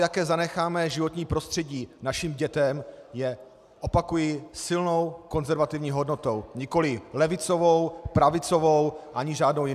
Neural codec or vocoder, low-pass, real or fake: none; 14.4 kHz; real